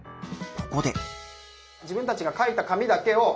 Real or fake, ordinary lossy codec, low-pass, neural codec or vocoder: real; none; none; none